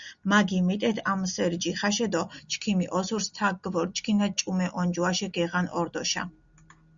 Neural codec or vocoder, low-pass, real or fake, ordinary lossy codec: none; 7.2 kHz; real; Opus, 64 kbps